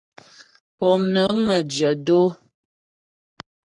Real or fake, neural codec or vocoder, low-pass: fake; codec, 44.1 kHz, 3.4 kbps, Pupu-Codec; 10.8 kHz